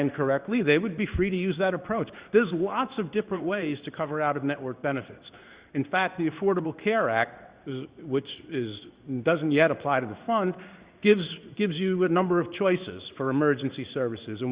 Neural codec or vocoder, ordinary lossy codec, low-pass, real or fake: codec, 16 kHz in and 24 kHz out, 1 kbps, XY-Tokenizer; Opus, 64 kbps; 3.6 kHz; fake